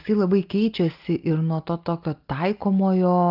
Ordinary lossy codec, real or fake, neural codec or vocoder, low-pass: Opus, 32 kbps; real; none; 5.4 kHz